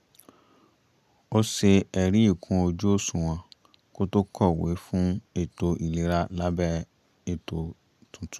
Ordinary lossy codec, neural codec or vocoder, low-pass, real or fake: none; none; 14.4 kHz; real